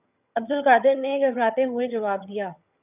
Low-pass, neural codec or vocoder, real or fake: 3.6 kHz; vocoder, 22.05 kHz, 80 mel bands, HiFi-GAN; fake